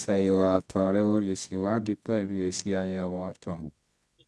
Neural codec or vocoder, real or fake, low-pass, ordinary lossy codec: codec, 24 kHz, 0.9 kbps, WavTokenizer, medium music audio release; fake; none; none